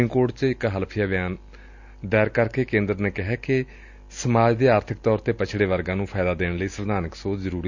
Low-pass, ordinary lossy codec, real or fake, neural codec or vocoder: 7.2 kHz; Opus, 64 kbps; real; none